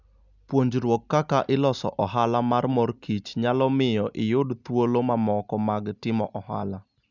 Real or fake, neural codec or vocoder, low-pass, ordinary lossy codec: real; none; 7.2 kHz; none